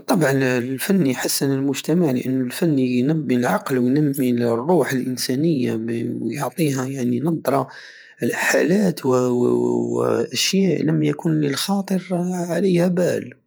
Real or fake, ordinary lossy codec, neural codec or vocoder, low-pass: fake; none; vocoder, 48 kHz, 128 mel bands, Vocos; none